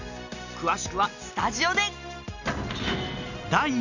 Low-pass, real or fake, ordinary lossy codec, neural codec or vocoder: 7.2 kHz; real; none; none